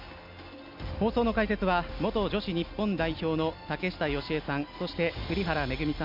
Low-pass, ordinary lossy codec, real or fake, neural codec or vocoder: 5.4 kHz; MP3, 48 kbps; real; none